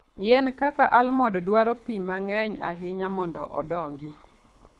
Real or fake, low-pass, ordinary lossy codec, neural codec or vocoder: fake; none; none; codec, 24 kHz, 3 kbps, HILCodec